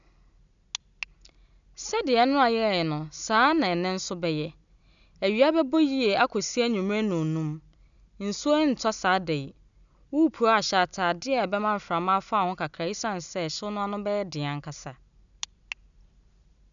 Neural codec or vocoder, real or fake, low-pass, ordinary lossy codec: none; real; 7.2 kHz; none